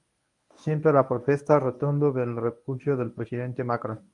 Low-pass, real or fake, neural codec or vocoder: 10.8 kHz; fake; codec, 24 kHz, 0.9 kbps, WavTokenizer, medium speech release version 1